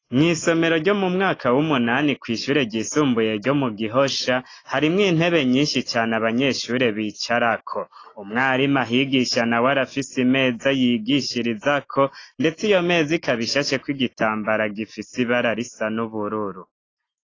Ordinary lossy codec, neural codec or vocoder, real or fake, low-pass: AAC, 32 kbps; none; real; 7.2 kHz